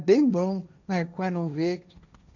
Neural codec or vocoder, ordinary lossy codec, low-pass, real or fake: codec, 16 kHz, 1.1 kbps, Voila-Tokenizer; none; 7.2 kHz; fake